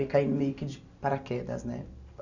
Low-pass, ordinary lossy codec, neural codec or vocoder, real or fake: 7.2 kHz; none; none; real